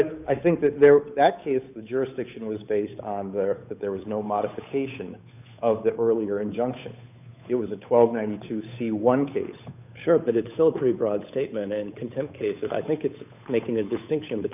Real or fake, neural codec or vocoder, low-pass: fake; codec, 16 kHz, 8 kbps, FunCodec, trained on Chinese and English, 25 frames a second; 3.6 kHz